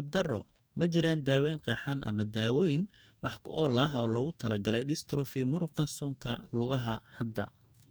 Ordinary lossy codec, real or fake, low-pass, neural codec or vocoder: none; fake; none; codec, 44.1 kHz, 2.6 kbps, DAC